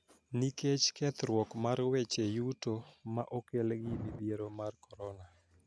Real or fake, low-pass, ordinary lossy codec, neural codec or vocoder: real; none; none; none